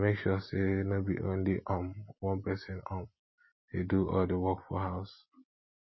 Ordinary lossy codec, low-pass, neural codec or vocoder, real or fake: MP3, 24 kbps; 7.2 kHz; none; real